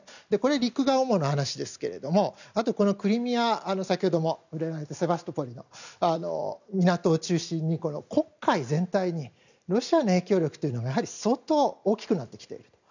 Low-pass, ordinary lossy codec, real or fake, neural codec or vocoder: 7.2 kHz; none; real; none